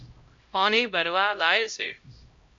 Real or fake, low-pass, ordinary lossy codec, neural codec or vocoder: fake; 7.2 kHz; MP3, 48 kbps; codec, 16 kHz, 0.5 kbps, X-Codec, HuBERT features, trained on LibriSpeech